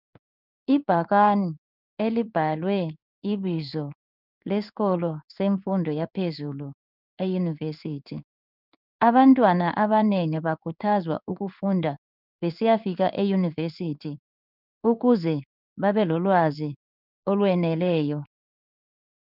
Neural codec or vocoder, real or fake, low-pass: codec, 16 kHz in and 24 kHz out, 1 kbps, XY-Tokenizer; fake; 5.4 kHz